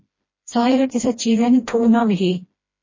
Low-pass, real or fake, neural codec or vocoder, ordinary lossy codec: 7.2 kHz; fake; codec, 16 kHz, 1 kbps, FreqCodec, smaller model; MP3, 32 kbps